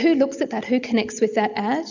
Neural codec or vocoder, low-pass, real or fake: none; 7.2 kHz; real